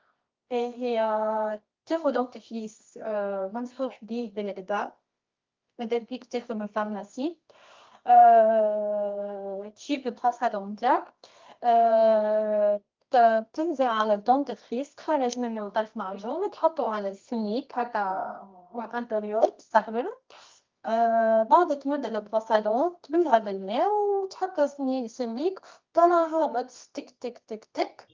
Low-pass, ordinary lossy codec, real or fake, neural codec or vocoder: 7.2 kHz; Opus, 24 kbps; fake; codec, 24 kHz, 0.9 kbps, WavTokenizer, medium music audio release